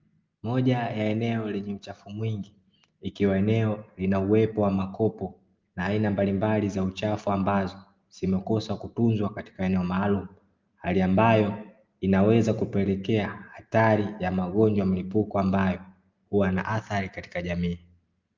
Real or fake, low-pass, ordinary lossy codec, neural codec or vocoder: real; 7.2 kHz; Opus, 24 kbps; none